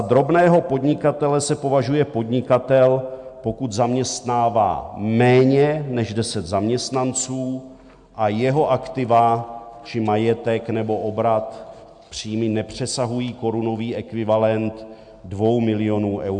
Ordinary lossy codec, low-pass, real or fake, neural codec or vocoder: MP3, 64 kbps; 9.9 kHz; real; none